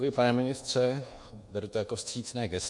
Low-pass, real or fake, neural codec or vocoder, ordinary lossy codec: 10.8 kHz; fake; codec, 24 kHz, 1.2 kbps, DualCodec; MP3, 48 kbps